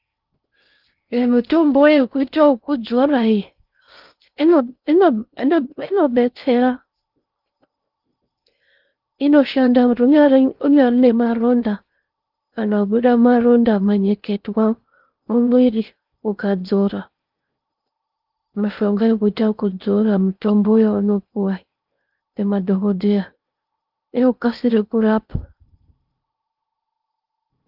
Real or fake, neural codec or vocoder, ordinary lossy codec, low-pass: fake; codec, 16 kHz in and 24 kHz out, 0.6 kbps, FocalCodec, streaming, 2048 codes; Opus, 32 kbps; 5.4 kHz